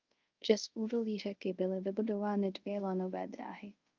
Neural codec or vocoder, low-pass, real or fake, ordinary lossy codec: codec, 24 kHz, 0.5 kbps, DualCodec; 7.2 kHz; fake; Opus, 24 kbps